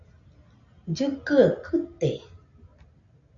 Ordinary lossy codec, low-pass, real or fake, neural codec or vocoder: MP3, 64 kbps; 7.2 kHz; real; none